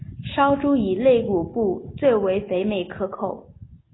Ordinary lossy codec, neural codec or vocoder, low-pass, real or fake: AAC, 16 kbps; none; 7.2 kHz; real